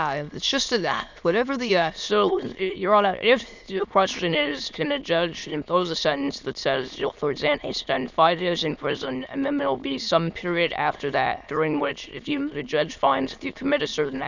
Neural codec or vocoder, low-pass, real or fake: autoencoder, 22.05 kHz, a latent of 192 numbers a frame, VITS, trained on many speakers; 7.2 kHz; fake